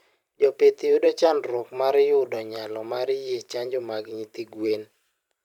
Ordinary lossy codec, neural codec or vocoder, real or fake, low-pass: none; vocoder, 44.1 kHz, 128 mel bands every 512 samples, BigVGAN v2; fake; 19.8 kHz